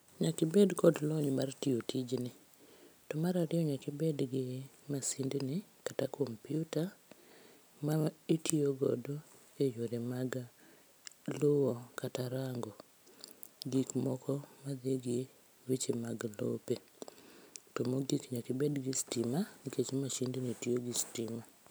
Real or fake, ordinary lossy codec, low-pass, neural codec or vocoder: real; none; none; none